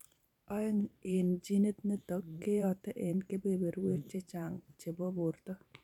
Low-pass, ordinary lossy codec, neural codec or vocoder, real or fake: 19.8 kHz; none; vocoder, 44.1 kHz, 128 mel bands every 512 samples, BigVGAN v2; fake